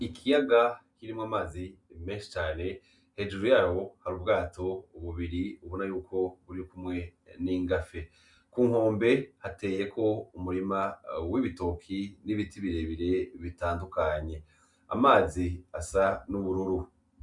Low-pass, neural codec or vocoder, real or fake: 10.8 kHz; none; real